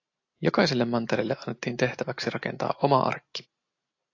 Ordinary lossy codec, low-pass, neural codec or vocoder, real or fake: AAC, 48 kbps; 7.2 kHz; none; real